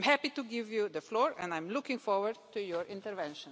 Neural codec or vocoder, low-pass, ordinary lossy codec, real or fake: none; none; none; real